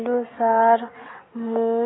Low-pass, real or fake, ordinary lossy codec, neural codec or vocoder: 7.2 kHz; real; AAC, 16 kbps; none